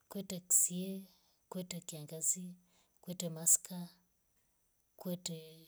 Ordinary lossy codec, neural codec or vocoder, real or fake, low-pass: none; none; real; none